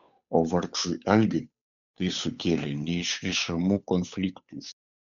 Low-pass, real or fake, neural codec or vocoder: 7.2 kHz; fake; codec, 16 kHz, 8 kbps, FunCodec, trained on Chinese and English, 25 frames a second